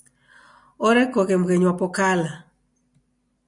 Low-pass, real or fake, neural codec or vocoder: 10.8 kHz; real; none